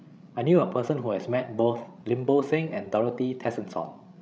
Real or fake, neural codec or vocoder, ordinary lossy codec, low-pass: fake; codec, 16 kHz, 16 kbps, FreqCodec, larger model; none; none